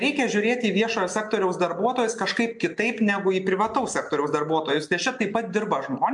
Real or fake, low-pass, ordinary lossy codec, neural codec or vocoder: real; 10.8 kHz; MP3, 64 kbps; none